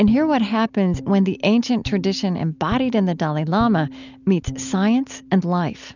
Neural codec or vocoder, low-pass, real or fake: none; 7.2 kHz; real